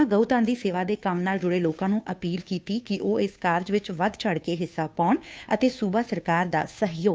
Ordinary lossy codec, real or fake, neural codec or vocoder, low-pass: none; fake; codec, 16 kHz, 2 kbps, FunCodec, trained on Chinese and English, 25 frames a second; none